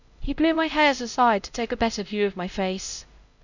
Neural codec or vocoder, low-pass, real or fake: codec, 16 kHz, 0.5 kbps, X-Codec, HuBERT features, trained on LibriSpeech; 7.2 kHz; fake